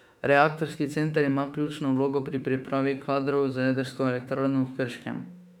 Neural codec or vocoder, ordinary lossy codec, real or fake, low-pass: autoencoder, 48 kHz, 32 numbers a frame, DAC-VAE, trained on Japanese speech; none; fake; 19.8 kHz